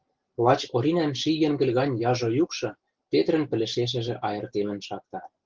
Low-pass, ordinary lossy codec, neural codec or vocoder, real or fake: 7.2 kHz; Opus, 16 kbps; none; real